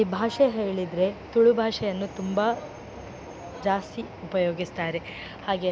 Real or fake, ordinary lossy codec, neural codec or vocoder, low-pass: real; none; none; none